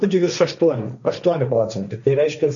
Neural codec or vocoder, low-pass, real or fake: codec, 16 kHz, 1.1 kbps, Voila-Tokenizer; 7.2 kHz; fake